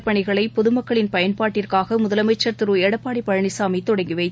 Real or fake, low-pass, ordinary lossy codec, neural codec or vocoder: real; none; none; none